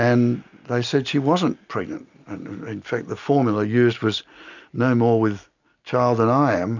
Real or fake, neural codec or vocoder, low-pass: real; none; 7.2 kHz